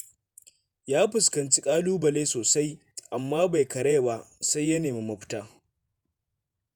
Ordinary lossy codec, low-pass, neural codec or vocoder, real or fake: none; none; vocoder, 48 kHz, 128 mel bands, Vocos; fake